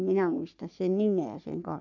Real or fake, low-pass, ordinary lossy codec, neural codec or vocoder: fake; 7.2 kHz; none; codec, 44.1 kHz, 3.4 kbps, Pupu-Codec